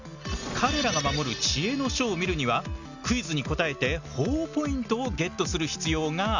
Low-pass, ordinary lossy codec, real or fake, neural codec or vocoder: 7.2 kHz; none; real; none